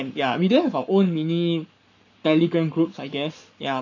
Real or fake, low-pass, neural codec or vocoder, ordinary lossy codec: fake; 7.2 kHz; codec, 44.1 kHz, 7.8 kbps, Pupu-Codec; none